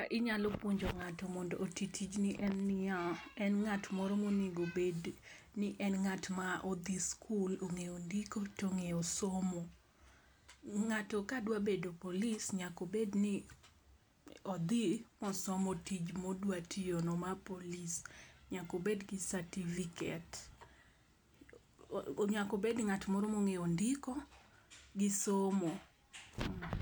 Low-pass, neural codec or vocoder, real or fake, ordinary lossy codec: none; none; real; none